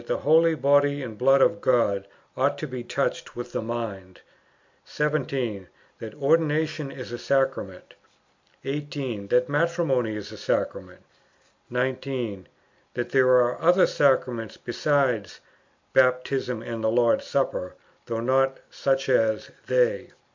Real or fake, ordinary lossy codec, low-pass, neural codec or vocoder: real; MP3, 64 kbps; 7.2 kHz; none